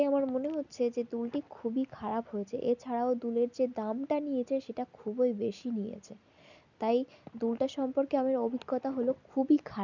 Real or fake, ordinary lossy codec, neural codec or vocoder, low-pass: real; none; none; 7.2 kHz